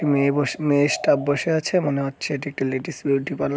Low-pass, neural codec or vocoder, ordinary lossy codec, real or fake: none; none; none; real